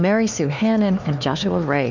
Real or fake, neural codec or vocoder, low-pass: fake; codec, 16 kHz, 4 kbps, X-Codec, HuBERT features, trained on LibriSpeech; 7.2 kHz